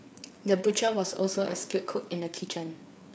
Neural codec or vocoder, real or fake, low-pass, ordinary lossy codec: codec, 16 kHz, 4 kbps, FreqCodec, larger model; fake; none; none